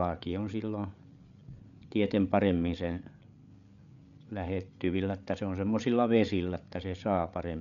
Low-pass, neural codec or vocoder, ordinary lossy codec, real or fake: 7.2 kHz; codec, 16 kHz, 8 kbps, FreqCodec, larger model; none; fake